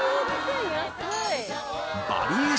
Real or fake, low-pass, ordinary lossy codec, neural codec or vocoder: real; none; none; none